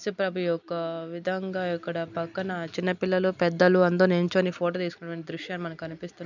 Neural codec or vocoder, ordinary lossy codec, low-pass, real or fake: none; none; 7.2 kHz; real